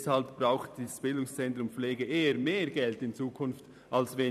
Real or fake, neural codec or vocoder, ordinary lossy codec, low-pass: fake; vocoder, 44.1 kHz, 128 mel bands every 512 samples, BigVGAN v2; none; 14.4 kHz